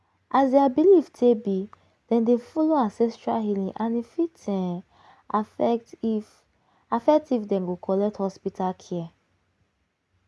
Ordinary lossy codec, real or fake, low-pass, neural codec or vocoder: none; real; none; none